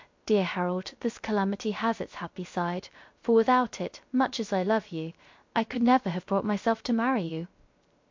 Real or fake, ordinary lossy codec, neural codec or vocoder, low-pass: fake; MP3, 48 kbps; codec, 16 kHz, 0.3 kbps, FocalCodec; 7.2 kHz